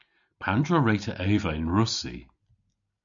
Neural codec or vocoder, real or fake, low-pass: none; real; 7.2 kHz